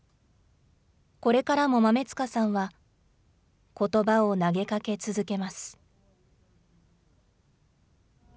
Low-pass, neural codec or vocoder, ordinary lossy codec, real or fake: none; none; none; real